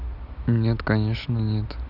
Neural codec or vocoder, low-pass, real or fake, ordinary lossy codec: none; 5.4 kHz; real; none